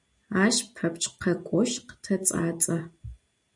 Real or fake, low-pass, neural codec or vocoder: real; 10.8 kHz; none